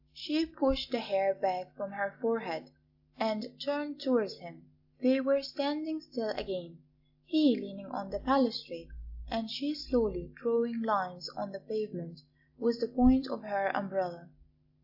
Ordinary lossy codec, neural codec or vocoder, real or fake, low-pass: AAC, 48 kbps; none; real; 5.4 kHz